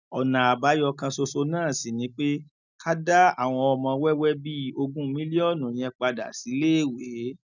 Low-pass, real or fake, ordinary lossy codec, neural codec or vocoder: 7.2 kHz; real; none; none